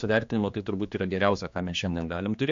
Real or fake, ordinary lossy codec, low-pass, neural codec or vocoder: fake; MP3, 48 kbps; 7.2 kHz; codec, 16 kHz, 2 kbps, X-Codec, HuBERT features, trained on general audio